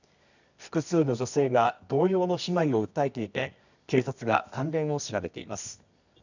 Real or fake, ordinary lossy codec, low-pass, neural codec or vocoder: fake; none; 7.2 kHz; codec, 24 kHz, 0.9 kbps, WavTokenizer, medium music audio release